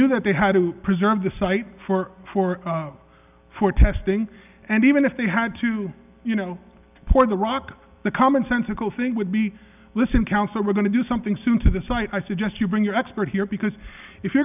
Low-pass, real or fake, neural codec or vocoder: 3.6 kHz; real; none